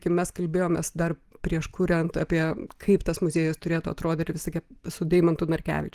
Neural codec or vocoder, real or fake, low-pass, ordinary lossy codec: none; real; 14.4 kHz; Opus, 24 kbps